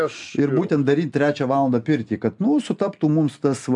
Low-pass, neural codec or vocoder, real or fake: 10.8 kHz; none; real